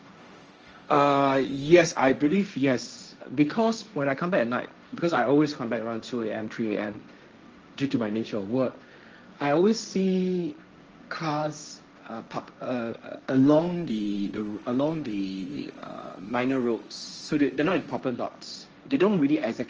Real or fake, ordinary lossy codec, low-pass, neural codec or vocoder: fake; Opus, 24 kbps; 7.2 kHz; codec, 16 kHz, 1.1 kbps, Voila-Tokenizer